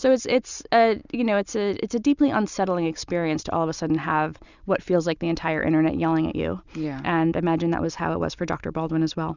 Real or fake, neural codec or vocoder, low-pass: real; none; 7.2 kHz